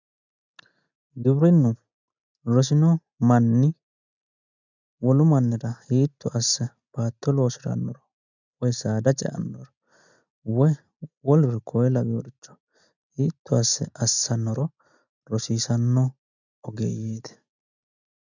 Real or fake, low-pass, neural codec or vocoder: real; 7.2 kHz; none